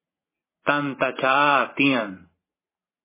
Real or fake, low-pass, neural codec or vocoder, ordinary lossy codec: fake; 3.6 kHz; vocoder, 44.1 kHz, 128 mel bands every 512 samples, BigVGAN v2; MP3, 16 kbps